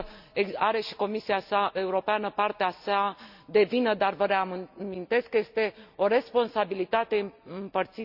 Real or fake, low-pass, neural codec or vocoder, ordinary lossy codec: real; 5.4 kHz; none; none